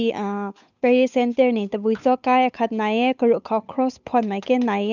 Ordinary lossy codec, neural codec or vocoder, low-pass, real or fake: none; codec, 16 kHz, 8 kbps, FunCodec, trained on Chinese and English, 25 frames a second; 7.2 kHz; fake